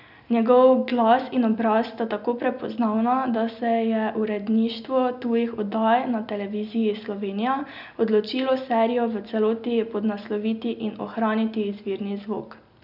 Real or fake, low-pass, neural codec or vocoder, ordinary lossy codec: real; 5.4 kHz; none; none